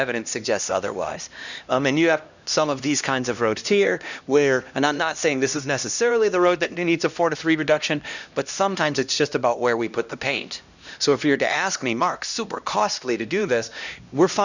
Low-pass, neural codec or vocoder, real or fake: 7.2 kHz; codec, 16 kHz, 1 kbps, X-Codec, HuBERT features, trained on LibriSpeech; fake